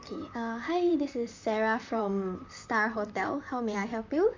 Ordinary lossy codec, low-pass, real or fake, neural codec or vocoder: MP3, 64 kbps; 7.2 kHz; fake; vocoder, 44.1 kHz, 128 mel bands, Pupu-Vocoder